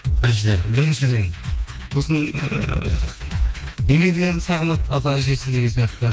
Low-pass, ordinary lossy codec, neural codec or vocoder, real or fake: none; none; codec, 16 kHz, 2 kbps, FreqCodec, smaller model; fake